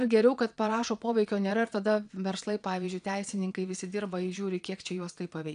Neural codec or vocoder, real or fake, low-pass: vocoder, 22.05 kHz, 80 mel bands, Vocos; fake; 9.9 kHz